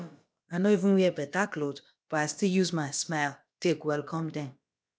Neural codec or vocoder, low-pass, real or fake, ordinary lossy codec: codec, 16 kHz, about 1 kbps, DyCAST, with the encoder's durations; none; fake; none